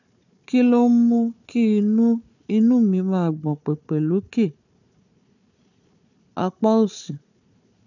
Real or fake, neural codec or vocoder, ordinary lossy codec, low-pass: fake; codec, 16 kHz, 4 kbps, FunCodec, trained on Chinese and English, 50 frames a second; none; 7.2 kHz